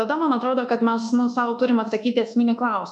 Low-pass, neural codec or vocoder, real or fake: 10.8 kHz; codec, 24 kHz, 1.2 kbps, DualCodec; fake